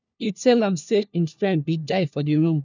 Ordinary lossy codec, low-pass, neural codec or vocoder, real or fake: none; 7.2 kHz; codec, 16 kHz, 1 kbps, FunCodec, trained on LibriTTS, 50 frames a second; fake